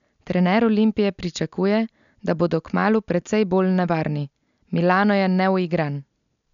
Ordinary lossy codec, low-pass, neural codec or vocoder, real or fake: none; 7.2 kHz; none; real